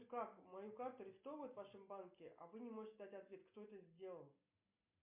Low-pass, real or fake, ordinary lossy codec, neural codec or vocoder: 3.6 kHz; real; MP3, 32 kbps; none